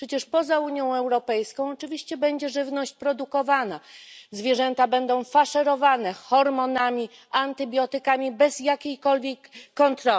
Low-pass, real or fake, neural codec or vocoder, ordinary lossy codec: none; real; none; none